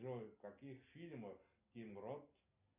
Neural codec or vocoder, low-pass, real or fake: none; 3.6 kHz; real